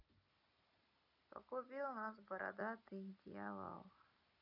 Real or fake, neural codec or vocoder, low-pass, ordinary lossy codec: real; none; 5.4 kHz; none